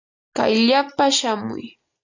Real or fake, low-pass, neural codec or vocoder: real; 7.2 kHz; none